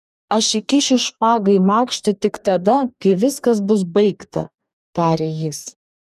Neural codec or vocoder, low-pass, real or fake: codec, 44.1 kHz, 2.6 kbps, DAC; 14.4 kHz; fake